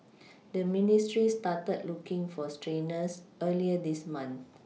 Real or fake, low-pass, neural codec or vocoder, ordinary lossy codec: real; none; none; none